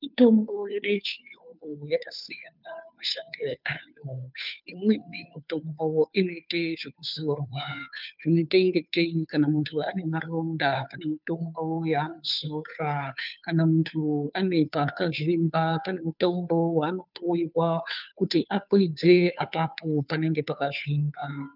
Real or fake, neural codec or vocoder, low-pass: fake; codec, 16 kHz, 2 kbps, FunCodec, trained on Chinese and English, 25 frames a second; 5.4 kHz